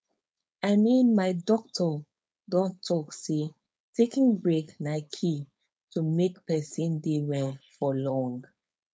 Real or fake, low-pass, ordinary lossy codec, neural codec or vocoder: fake; none; none; codec, 16 kHz, 4.8 kbps, FACodec